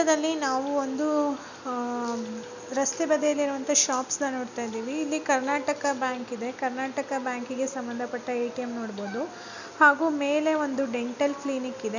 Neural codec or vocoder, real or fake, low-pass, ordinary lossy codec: none; real; 7.2 kHz; none